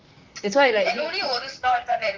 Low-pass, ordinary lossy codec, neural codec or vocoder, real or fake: 7.2 kHz; Opus, 32 kbps; vocoder, 44.1 kHz, 128 mel bands, Pupu-Vocoder; fake